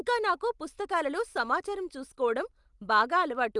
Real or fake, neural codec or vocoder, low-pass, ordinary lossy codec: real; none; 10.8 kHz; Opus, 32 kbps